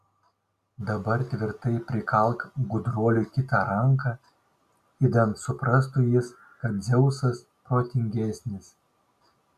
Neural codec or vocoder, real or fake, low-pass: none; real; 14.4 kHz